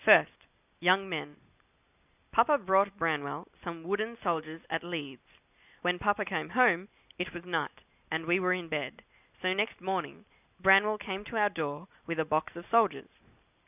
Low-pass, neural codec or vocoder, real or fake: 3.6 kHz; none; real